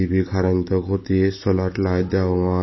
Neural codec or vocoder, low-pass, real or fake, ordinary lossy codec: none; 7.2 kHz; real; MP3, 24 kbps